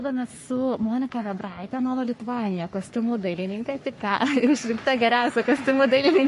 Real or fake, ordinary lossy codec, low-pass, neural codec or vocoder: fake; MP3, 48 kbps; 14.4 kHz; codec, 44.1 kHz, 3.4 kbps, Pupu-Codec